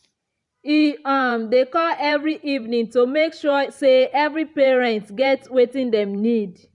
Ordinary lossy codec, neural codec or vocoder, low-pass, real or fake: none; vocoder, 44.1 kHz, 128 mel bands every 512 samples, BigVGAN v2; 10.8 kHz; fake